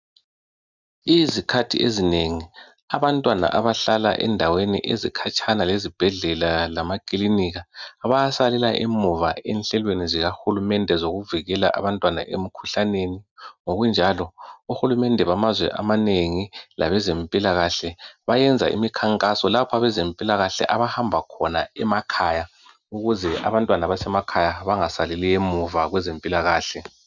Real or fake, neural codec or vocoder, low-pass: real; none; 7.2 kHz